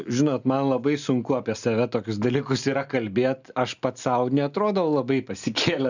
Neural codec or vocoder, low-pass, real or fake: none; 7.2 kHz; real